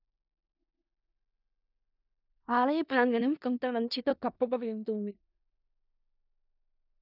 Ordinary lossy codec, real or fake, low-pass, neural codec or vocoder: none; fake; 5.4 kHz; codec, 16 kHz in and 24 kHz out, 0.4 kbps, LongCat-Audio-Codec, four codebook decoder